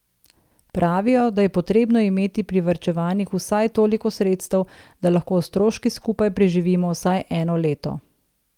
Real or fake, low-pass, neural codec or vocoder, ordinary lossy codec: real; 19.8 kHz; none; Opus, 32 kbps